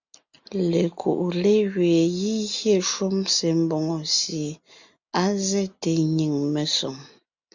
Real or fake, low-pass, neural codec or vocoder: real; 7.2 kHz; none